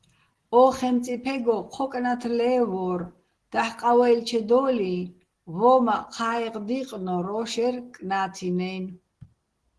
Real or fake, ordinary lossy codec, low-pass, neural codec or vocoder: real; Opus, 16 kbps; 10.8 kHz; none